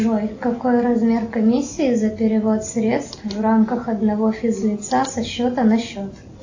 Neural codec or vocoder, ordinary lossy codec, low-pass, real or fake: none; AAC, 48 kbps; 7.2 kHz; real